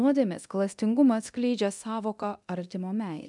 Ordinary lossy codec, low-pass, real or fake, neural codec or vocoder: MP3, 96 kbps; 10.8 kHz; fake; codec, 24 kHz, 0.9 kbps, DualCodec